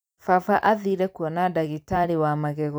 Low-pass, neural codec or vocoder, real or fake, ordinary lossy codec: none; vocoder, 44.1 kHz, 128 mel bands every 256 samples, BigVGAN v2; fake; none